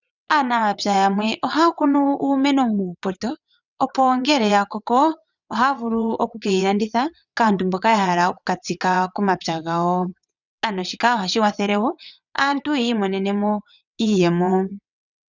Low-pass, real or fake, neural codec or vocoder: 7.2 kHz; fake; vocoder, 22.05 kHz, 80 mel bands, WaveNeXt